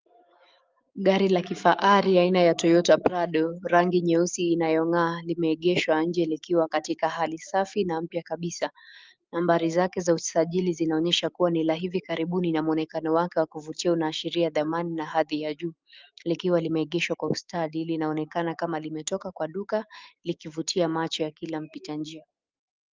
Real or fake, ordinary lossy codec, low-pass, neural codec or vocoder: fake; Opus, 24 kbps; 7.2 kHz; autoencoder, 48 kHz, 128 numbers a frame, DAC-VAE, trained on Japanese speech